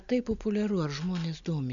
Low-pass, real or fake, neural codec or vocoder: 7.2 kHz; real; none